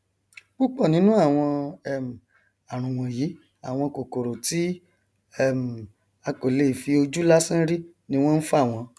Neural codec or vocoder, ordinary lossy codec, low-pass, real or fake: none; none; none; real